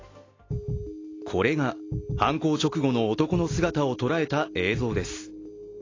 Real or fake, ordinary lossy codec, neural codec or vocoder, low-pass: real; AAC, 32 kbps; none; 7.2 kHz